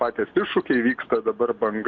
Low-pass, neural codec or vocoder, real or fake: 7.2 kHz; none; real